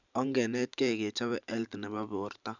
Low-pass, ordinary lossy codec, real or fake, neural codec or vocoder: 7.2 kHz; none; fake; vocoder, 44.1 kHz, 128 mel bands every 512 samples, BigVGAN v2